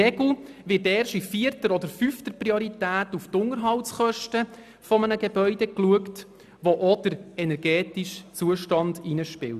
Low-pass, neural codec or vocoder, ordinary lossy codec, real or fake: 14.4 kHz; none; none; real